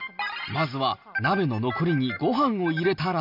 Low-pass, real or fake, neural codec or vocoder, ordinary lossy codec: 5.4 kHz; real; none; none